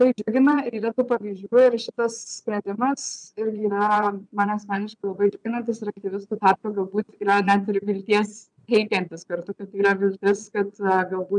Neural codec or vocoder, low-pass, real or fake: vocoder, 22.05 kHz, 80 mel bands, Vocos; 9.9 kHz; fake